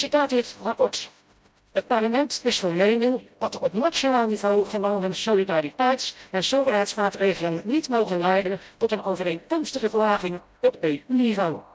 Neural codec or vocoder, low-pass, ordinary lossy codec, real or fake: codec, 16 kHz, 0.5 kbps, FreqCodec, smaller model; none; none; fake